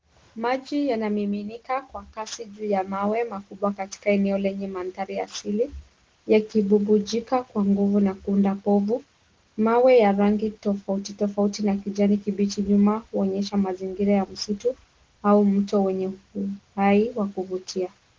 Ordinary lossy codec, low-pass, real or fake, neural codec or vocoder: Opus, 16 kbps; 7.2 kHz; real; none